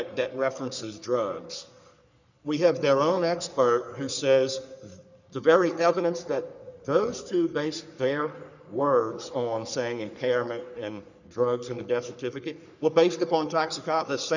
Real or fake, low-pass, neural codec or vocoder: fake; 7.2 kHz; codec, 44.1 kHz, 3.4 kbps, Pupu-Codec